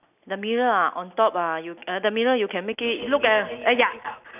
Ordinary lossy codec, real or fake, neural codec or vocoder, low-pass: none; real; none; 3.6 kHz